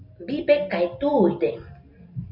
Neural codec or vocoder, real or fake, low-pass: none; real; 5.4 kHz